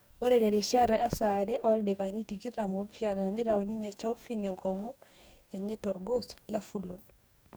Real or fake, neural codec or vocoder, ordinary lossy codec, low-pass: fake; codec, 44.1 kHz, 2.6 kbps, DAC; none; none